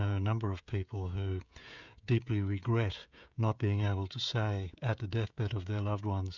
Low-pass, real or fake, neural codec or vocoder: 7.2 kHz; fake; codec, 16 kHz, 16 kbps, FreqCodec, smaller model